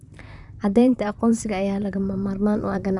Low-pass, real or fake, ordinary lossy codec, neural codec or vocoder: 10.8 kHz; fake; none; vocoder, 44.1 kHz, 128 mel bands, Pupu-Vocoder